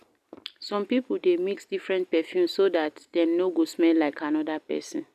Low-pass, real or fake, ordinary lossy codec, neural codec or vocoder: 14.4 kHz; real; none; none